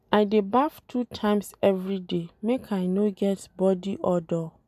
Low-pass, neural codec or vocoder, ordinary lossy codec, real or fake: 14.4 kHz; none; none; real